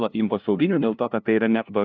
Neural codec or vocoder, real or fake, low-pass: codec, 16 kHz, 0.5 kbps, FunCodec, trained on LibriTTS, 25 frames a second; fake; 7.2 kHz